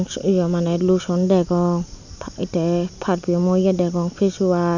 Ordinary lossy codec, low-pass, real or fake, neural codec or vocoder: none; 7.2 kHz; real; none